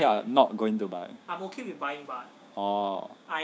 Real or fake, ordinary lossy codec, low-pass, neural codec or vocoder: fake; none; none; codec, 16 kHz, 6 kbps, DAC